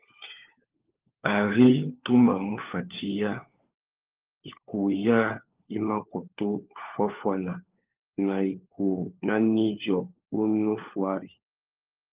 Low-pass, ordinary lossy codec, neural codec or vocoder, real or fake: 3.6 kHz; Opus, 32 kbps; codec, 16 kHz, 4 kbps, FunCodec, trained on LibriTTS, 50 frames a second; fake